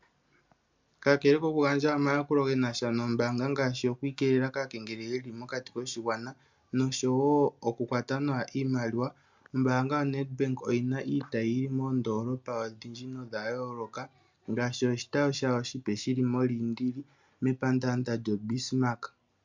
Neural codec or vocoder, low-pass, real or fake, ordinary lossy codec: none; 7.2 kHz; real; MP3, 64 kbps